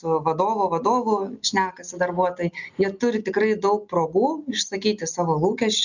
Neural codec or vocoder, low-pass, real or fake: none; 7.2 kHz; real